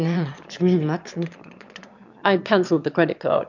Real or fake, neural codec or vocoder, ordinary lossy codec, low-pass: fake; autoencoder, 22.05 kHz, a latent of 192 numbers a frame, VITS, trained on one speaker; MP3, 64 kbps; 7.2 kHz